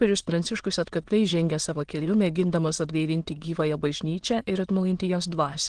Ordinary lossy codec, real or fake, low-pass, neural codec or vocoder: Opus, 16 kbps; fake; 9.9 kHz; autoencoder, 22.05 kHz, a latent of 192 numbers a frame, VITS, trained on many speakers